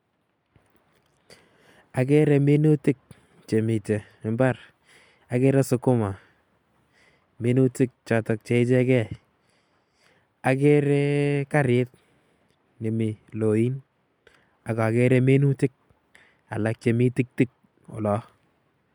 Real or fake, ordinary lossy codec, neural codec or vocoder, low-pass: real; MP3, 96 kbps; none; 19.8 kHz